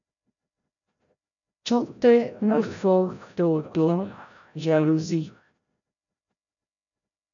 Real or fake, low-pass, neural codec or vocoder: fake; 7.2 kHz; codec, 16 kHz, 0.5 kbps, FreqCodec, larger model